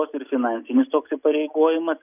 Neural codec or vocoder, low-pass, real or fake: none; 3.6 kHz; real